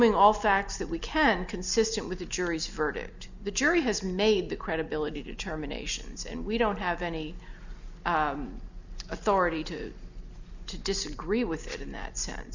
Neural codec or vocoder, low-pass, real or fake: none; 7.2 kHz; real